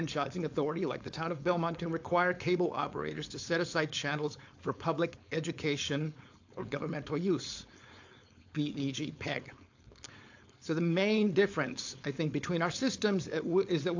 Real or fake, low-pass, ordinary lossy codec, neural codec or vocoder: fake; 7.2 kHz; AAC, 48 kbps; codec, 16 kHz, 4.8 kbps, FACodec